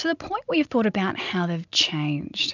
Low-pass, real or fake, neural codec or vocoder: 7.2 kHz; real; none